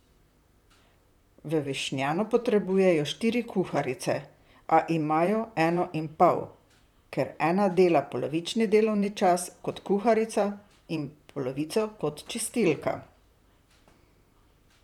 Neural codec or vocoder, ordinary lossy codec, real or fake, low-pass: vocoder, 44.1 kHz, 128 mel bands, Pupu-Vocoder; none; fake; 19.8 kHz